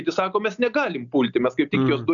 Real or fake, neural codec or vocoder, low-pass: real; none; 7.2 kHz